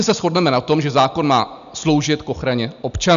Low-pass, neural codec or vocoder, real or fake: 7.2 kHz; none; real